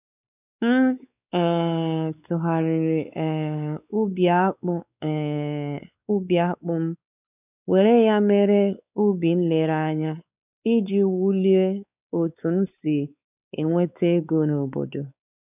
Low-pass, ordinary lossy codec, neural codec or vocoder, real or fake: 3.6 kHz; none; codec, 16 kHz, 4 kbps, X-Codec, WavLM features, trained on Multilingual LibriSpeech; fake